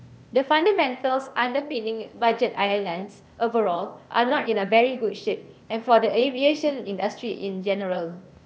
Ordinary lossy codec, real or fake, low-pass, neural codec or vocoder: none; fake; none; codec, 16 kHz, 0.8 kbps, ZipCodec